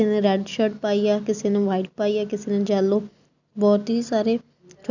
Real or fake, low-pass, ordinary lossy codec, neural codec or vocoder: real; 7.2 kHz; none; none